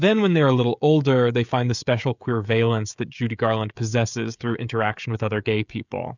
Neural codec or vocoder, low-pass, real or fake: codec, 16 kHz, 16 kbps, FreqCodec, smaller model; 7.2 kHz; fake